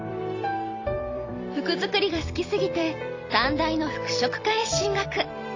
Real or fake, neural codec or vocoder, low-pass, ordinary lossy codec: fake; vocoder, 44.1 kHz, 128 mel bands every 256 samples, BigVGAN v2; 7.2 kHz; AAC, 32 kbps